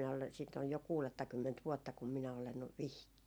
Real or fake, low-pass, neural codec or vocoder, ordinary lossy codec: real; none; none; none